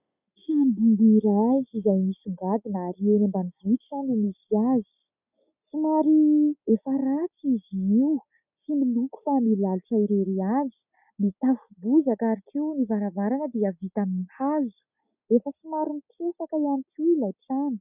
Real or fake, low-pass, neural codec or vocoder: real; 3.6 kHz; none